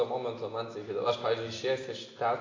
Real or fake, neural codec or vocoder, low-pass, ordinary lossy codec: real; none; 7.2 kHz; AAC, 32 kbps